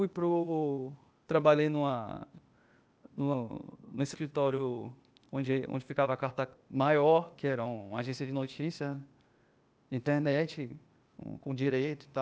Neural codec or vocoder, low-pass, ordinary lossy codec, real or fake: codec, 16 kHz, 0.8 kbps, ZipCodec; none; none; fake